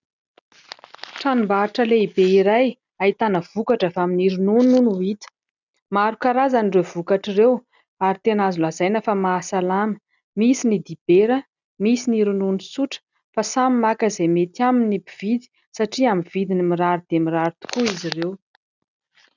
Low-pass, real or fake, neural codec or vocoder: 7.2 kHz; real; none